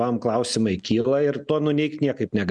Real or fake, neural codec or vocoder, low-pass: real; none; 10.8 kHz